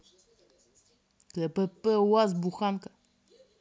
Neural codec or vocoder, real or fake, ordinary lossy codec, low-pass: none; real; none; none